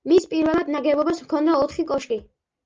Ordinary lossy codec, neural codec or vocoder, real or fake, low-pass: Opus, 24 kbps; none; real; 7.2 kHz